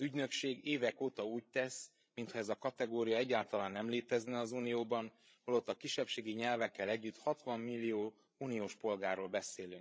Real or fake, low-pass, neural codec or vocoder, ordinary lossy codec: fake; none; codec, 16 kHz, 16 kbps, FreqCodec, larger model; none